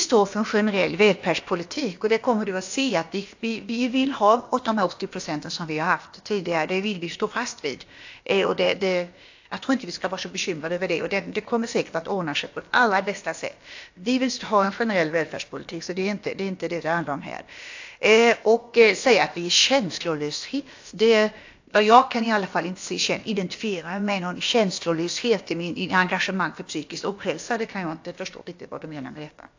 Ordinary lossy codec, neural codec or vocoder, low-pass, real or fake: AAC, 48 kbps; codec, 16 kHz, about 1 kbps, DyCAST, with the encoder's durations; 7.2 kHz; fake